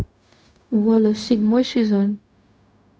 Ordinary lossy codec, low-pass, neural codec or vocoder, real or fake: none; none; codec, 16 kHz, 0.4 kbps, LongCat-Audio-Codec; fake